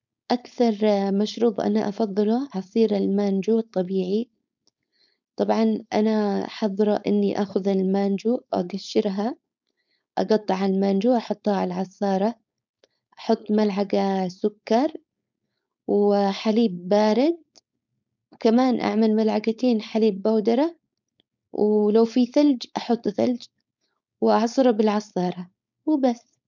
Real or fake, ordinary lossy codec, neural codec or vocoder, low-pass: fake; none; codec, 16 kHz, 4.8 kbps, FACodec; 7.2 kHz